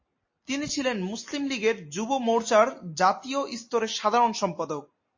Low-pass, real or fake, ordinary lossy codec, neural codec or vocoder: 7.2 kHz; real; MP3, 32 kbps; none